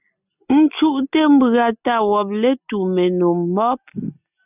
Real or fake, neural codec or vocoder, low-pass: real; none; 3.6 kHz